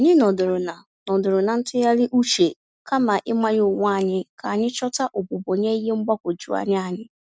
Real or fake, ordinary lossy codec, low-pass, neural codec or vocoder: real; none; none; none